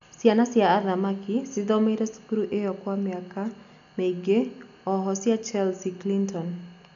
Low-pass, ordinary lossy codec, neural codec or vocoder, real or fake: 7.2 kHz; none; none; real